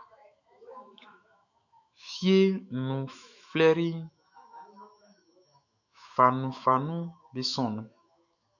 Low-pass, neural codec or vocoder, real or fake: 7.2 kHz; autoencoder, 48 kHz, 128 numbers a frame, DAC-VAE, trained on Japanese speech; fake